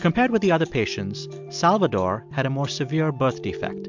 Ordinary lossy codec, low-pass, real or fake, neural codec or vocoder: MP3, 64 kbps; 7.2 kHz; real; none